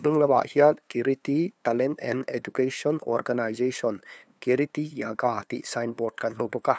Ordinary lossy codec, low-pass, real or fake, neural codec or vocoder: none; none; fake; codec, 16 kHz, 2 kbps, FunCodec, trained on LibriTTS, 25 frames a second